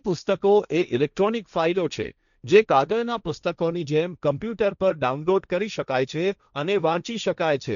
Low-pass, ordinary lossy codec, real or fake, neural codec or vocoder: 7.2 kHz; none; fake; codec, 16 kHz, 1.1 kbps, Voila-Tokenizer